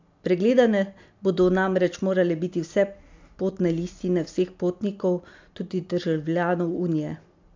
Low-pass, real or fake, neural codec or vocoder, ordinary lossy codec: 7.2 kHz; real; none; MP3, 64 kbps